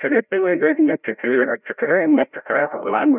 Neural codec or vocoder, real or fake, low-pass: codec, 16 kHz, 0.5 kbps, FreqCodec, larger model; fake; 3.6 kHz